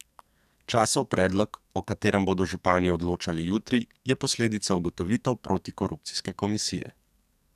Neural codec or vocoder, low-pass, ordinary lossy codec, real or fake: codec, 44.1 kHz, 2.6 kbps, SNAC; 14.4 kHz; none; fake